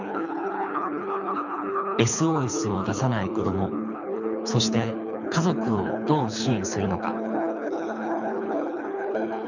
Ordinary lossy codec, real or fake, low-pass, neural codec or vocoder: none; fake; 7.2 kHz; codec, 24 kHz, 3 kbps, HILCodec